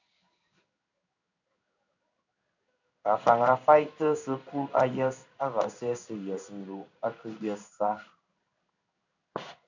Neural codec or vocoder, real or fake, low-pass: codec, 16 kHz in and 24 kHz out, 1 kbps, XY-Tokenizer; fake; 7.2 kHz